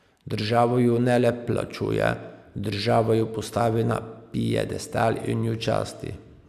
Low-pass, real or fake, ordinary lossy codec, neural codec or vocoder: 14.4 kHz; real; none; none